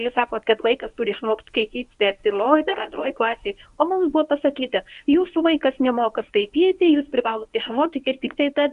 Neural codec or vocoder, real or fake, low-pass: codec, 24 kHz, 0.9 kbps, WavTokenizer, medium speech release version 1; fake; 10.8 kHz